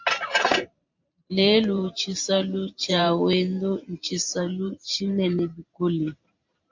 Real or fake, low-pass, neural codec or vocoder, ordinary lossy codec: fake; 7.2 kHz; vocoder, 44.1 kHz, 128 mel bands every 512 samples, BigVGAN v2; MP3, 64 kbps